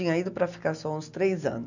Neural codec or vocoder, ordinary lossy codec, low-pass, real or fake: none; none; 7.2 kHz; real